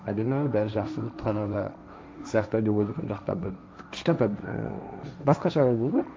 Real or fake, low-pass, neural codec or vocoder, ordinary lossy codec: fake; none; codec, 16 kHz, 1.1 kbps, Voila-Tokenizer; none